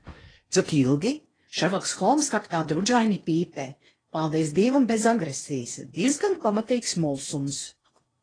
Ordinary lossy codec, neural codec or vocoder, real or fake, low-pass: AAC, 32 kbps; codec, 16 kHz in and 24 kHz out, 0.8 kbps, FocalCodec, streaming, 65536 codes; fake; 9.9 kHz